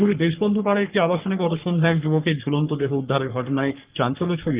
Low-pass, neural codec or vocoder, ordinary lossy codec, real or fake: 3.6 kHz; codec, 44.1 kHz, 3.4 kbps, Pupu-Codec; Opus, 32 kbps; fake